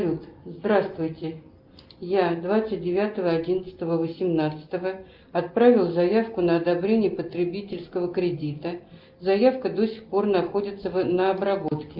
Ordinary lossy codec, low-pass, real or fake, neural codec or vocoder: Opus, 24 kbps; 5.4 kHz; real; none